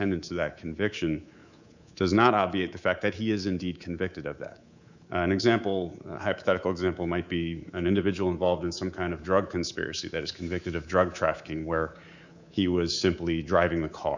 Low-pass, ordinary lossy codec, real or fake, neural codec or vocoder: 7.2 kHz; Opus, 64 kbps; fake; codec, 24 kHz, 3.1 kbps, DualCodec